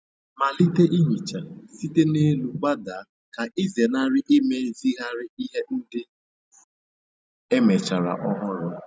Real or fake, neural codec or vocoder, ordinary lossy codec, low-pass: real; none; none; none